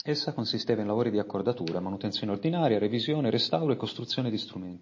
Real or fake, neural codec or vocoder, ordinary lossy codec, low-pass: real; none; MP3, 32 kbps; 7.2 kHz